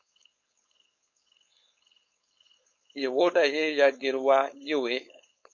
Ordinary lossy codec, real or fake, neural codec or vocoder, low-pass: MP3, 48 kbps; fake; codec, 16 kHz, 4.8 kbps, FACodec; 7.2 kHz